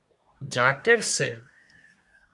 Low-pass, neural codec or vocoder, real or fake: 10.8 kHz; codec, 24 kHz, 1 kbps, SNAC; fake